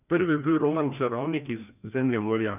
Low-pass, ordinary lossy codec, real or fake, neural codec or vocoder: 3.6 kHz; none; fake; codec, 16 kHz, 1 kbps, FreqCodec, larger model